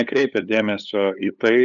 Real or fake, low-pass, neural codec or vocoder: fake; 7.2 kHz; codec, 16 kHz, 8 kbps, FunCodec, trained on LibriTTS, 25 frames a second